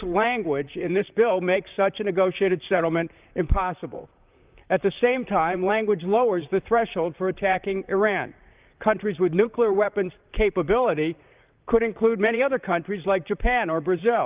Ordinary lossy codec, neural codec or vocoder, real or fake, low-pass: Opus, 32 kbps; vocoder, 44.1 kHz, 128 mel bands, Pupu-Vocoder; fake; 3.6 kHz